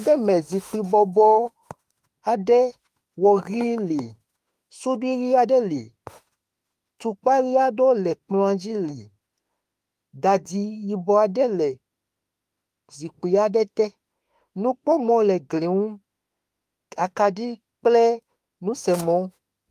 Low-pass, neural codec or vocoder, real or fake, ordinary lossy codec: 14.4 kHz; autoencoder, 48 kHz, 32 numbers a frame, DAC-VAE, trained on Japanese speech; fake; Opus, 32 kbps